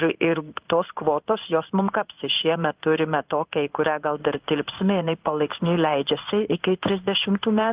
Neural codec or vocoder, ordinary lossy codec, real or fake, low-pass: codec, 16 kHz in and 24 kHz out, 1 kbps, XY-Tokenizer; Opus, 32 kbps; fake; 3.6 kHz